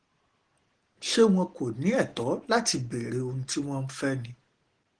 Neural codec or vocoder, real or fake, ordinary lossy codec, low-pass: none; real; Opus, 16 kbps; 9.9 kHz